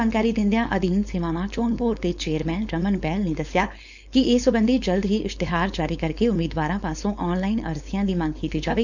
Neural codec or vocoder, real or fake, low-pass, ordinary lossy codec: codec, 16 kHz, 4.8 kbps, FACodec; fake; 7.2 kHz; none